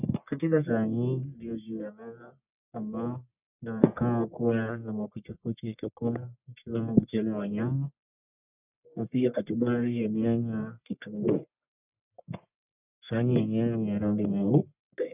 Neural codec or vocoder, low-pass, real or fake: codec, 44.1 kHz, 1.7 kbps, Pupu-Codec; 3.6 kHz; fake